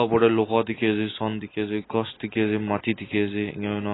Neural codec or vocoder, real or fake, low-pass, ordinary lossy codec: none; real; 7.2 kHz; AAC, 16 kbps